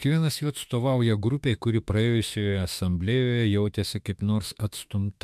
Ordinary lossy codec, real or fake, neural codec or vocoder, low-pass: MP3, 96 kbps; fake; autoencoder, 48 kHz, 32 numbers a frame, DAC-VAE, trained on Japanese speech; 14.4 kHz